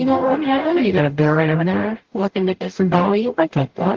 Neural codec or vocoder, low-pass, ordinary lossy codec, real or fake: codec, 44.1 kHz, 0.9 kbps, DAC; 7.2 kHz; Opus, 16 kbps; fake